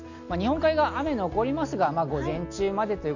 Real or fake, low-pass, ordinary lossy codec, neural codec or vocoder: real; 7.2 kHz; none; none